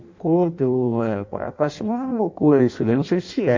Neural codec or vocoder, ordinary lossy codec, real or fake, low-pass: codec, 16 kHz in and 24 kHz out, 0.6 kbps, FireRedTTS-2 codec; MP3, 48 kbps; fake; 7.2 kHz